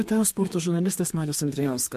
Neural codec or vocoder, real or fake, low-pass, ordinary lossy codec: codec, 32 kHz, 1.9 kbps, SNAC; fake; 14.4 kHz; MP3, 64 kbps